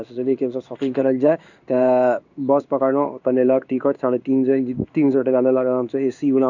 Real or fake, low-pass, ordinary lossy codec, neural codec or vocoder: fake; 7.2 kHz; none; codec, 16 kHz in and 24 kHz out, 1 kbps, XY-Tokenizer